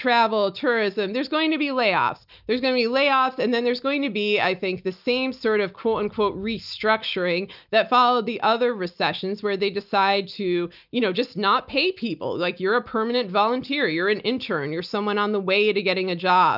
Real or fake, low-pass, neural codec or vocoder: real; 5.4 kHz; none